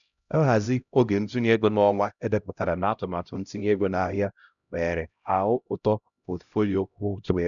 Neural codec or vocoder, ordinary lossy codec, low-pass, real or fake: codec, 16 kHz, 0.5 kbps, X-Codec, HuBERT features, trained on LibriSpeech; none; 7.2 kHz; fake